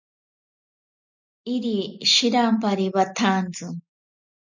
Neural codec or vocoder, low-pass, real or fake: none; 7.2 kHz; real